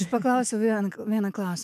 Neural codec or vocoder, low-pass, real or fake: autoencoder, 48 kHz, 128 numbers a frame, DAC-VAE, trained on Japanese speech; 14.4 kHz; fake